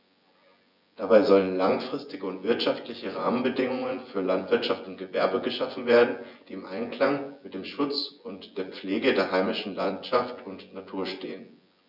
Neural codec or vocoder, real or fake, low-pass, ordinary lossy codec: vocoder, 24 kHz, 100 mel bands, Vocos; fake; 5.4 kHz; none